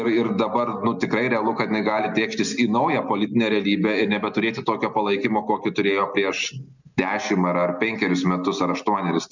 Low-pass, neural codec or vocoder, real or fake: 7.2 kHz; none; real